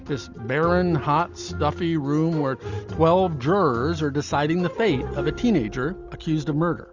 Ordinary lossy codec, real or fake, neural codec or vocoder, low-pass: Opus, 64 kbps; real; none; 7.2 kHz